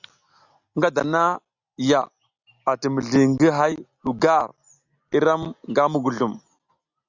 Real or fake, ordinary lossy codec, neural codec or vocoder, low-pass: real; Opus, 64 kbps; none; 7.2 kHz